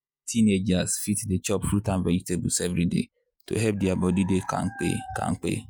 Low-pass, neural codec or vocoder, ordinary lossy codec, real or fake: none; vocoder, 48 kHz, 128 mel bands, Vocos; none; fake